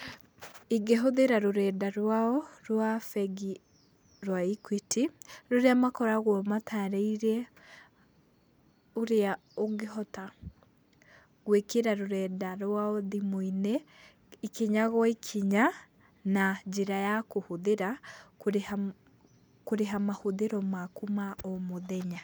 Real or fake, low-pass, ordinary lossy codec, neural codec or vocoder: real; none; none; none